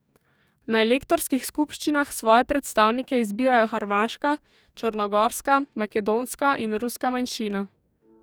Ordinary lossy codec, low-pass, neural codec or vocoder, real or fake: none; none; codec, 44.1 kHz, 2.6 kbps, DAC; fake